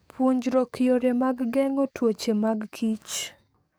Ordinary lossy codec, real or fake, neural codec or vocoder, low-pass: none; fake; codec, 44.1 kHz, 7.8 kbps, DAC; none